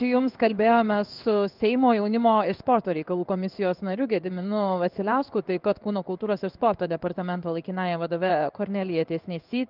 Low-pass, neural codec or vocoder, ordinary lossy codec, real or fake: 5.4 kHz; codec, 16 kHz in and 24 kHz out, 1 kbps, XY-Tokenizer; Opus, 24 kbps; fake